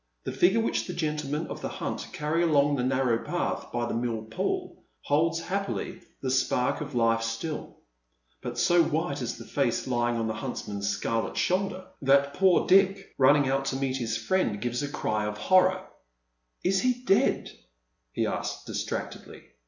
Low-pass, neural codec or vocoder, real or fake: 7.2 kHz; none; real